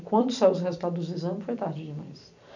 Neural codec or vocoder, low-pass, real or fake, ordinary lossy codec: vocoder, 44.1 kHz, 128 mel bands every 256 samples, BigVGAN v2; 7.2 kHz; fake; none